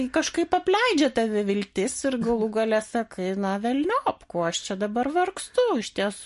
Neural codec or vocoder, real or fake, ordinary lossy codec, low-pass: none; real; MP3, 48 kbps; 14.4 kHz